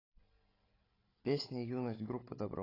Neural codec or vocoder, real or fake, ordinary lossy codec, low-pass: codec, 16 kHz, 16 kbps, FreqCodec, larger model; fake; AAC, 24 kbps; 5.4 kHz